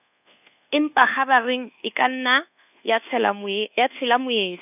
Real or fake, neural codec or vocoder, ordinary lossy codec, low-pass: fake; codec, 24 kHz, 1.2 kbps, DualCodec; none; 3.6 kHz